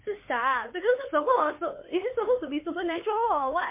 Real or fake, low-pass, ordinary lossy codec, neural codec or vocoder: fake; 3.6 kHz; MP3, 24 kbps; codec, 16 kHz, 4 kbps, X-Codec, HuBERT features, trained on LibriSpeech